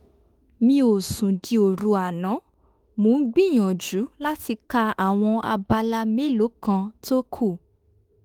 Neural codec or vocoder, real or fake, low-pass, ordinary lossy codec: autoencoder, 48 kHz, 32 numbers a frame, DAC-VAE, trained on Japanese speech; fake; 19.8 kHz; Opus, 24 kbps